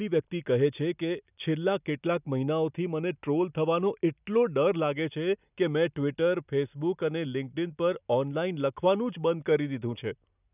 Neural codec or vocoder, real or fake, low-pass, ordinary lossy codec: none; real; 3.6 kHz; none